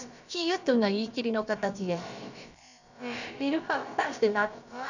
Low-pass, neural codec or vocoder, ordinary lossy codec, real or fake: 7.2 kHz; codec, 16 kHz, about 1 kbps, DyCAST, with the encoder's durations; none; fake